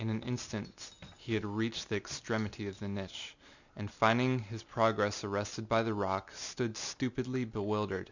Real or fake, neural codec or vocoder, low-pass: real; none; 7.2 kHz